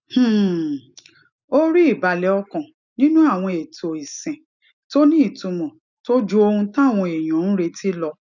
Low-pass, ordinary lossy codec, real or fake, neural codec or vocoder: 7.2 kHz; none; real; none